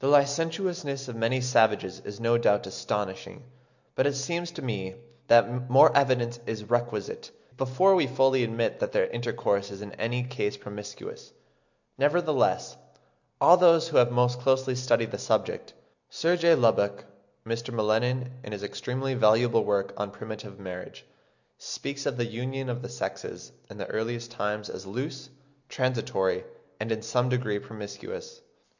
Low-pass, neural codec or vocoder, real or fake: 7.2 kHz; none; real